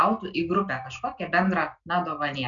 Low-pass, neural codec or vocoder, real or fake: 7.2 kHz; none; real